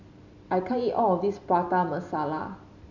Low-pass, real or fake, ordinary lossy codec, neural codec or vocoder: 7.2 kHz; real; none; none